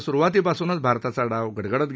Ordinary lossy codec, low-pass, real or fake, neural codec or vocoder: none; none; real; none